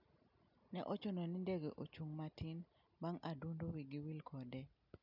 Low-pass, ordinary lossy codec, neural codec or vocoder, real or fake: 5.4 kHz; none; none; real